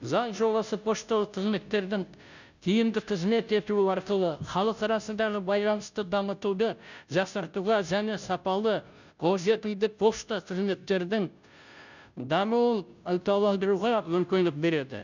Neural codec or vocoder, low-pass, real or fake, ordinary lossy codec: codec, 16 kHz, 0.5 kbps, FunCodec, trained on Chinese and English, 25 frames a second; 7.2 kHz; fake; none